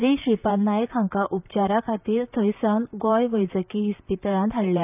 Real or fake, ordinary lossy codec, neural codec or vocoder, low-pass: fake; none; vocoder, 44.1 kHz, 128 mel bands, Pupu-Vocoder; 3.6 kHz